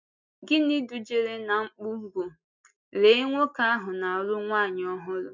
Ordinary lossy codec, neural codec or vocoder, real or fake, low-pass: MP3, 64 kbps; none; real; 7.2 kHz